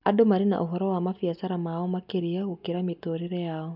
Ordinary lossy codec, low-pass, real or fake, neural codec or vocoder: none; 5.4 kHz; real; none